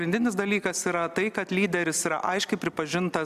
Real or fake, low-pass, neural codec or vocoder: real; 14.4 kHz; none